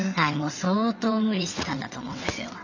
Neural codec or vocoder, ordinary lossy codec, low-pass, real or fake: vocoder, 22.05 kHz, 80 mel bands, WaveNeXt; none; 7.2 kHz; fake